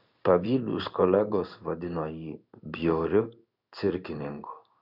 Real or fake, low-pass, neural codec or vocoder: fake; 5.4 kHz; codec, 16 kHz in and 24 kHz out, 1 kbps, XY-Tokenizer